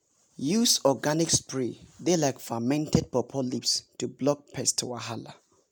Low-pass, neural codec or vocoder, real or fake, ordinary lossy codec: none; none; real; none